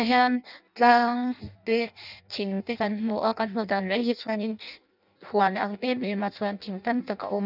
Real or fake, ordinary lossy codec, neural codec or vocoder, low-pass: fake; none; codec, 16 kHz in and 24 kHz out, 0.6 kbps, FireRedTTS-2 codec; 5.4 kHz